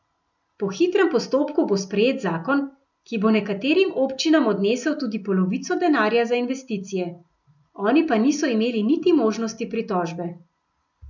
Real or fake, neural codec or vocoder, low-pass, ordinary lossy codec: real; none; 7.2 kHz; none